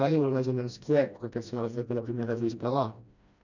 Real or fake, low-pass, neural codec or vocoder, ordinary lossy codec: fake; 7.2 kHz; codec, 16 kHz, 1 kbps, FreqCodec, smaller model; none